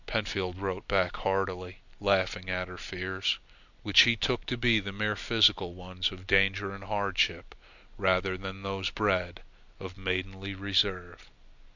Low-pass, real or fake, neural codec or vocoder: 7.2 kHz; real; none